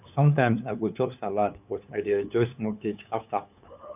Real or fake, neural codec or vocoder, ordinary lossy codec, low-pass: fake; codec, 16 kHz, 2 kbps, FunCodec, trained on LibriTTS, 25 frames a second; none; 3.6 kHz